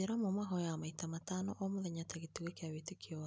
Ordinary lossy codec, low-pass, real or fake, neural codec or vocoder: none; none; real; none